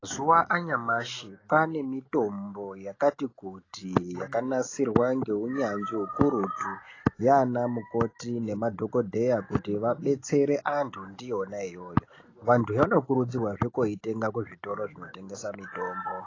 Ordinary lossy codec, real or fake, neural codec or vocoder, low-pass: AAC, 32 kbps; real; none; 7.2 kHz